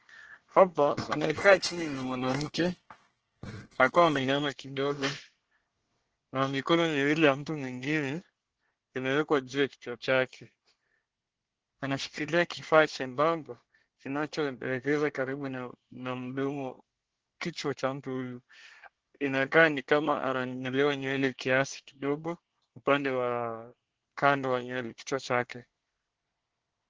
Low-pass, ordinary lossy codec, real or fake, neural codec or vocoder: 7.2 kHz; Opus, 16 kbps; fake; codec, 24 kHz, 1 kbps, SNAC